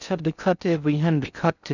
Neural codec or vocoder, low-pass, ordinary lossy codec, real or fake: codec, 16 kHz in and 24 kHz out, 0.6 kbps, FocalCodec, streaming, 4096 codes; 7.2 kHz; none; fake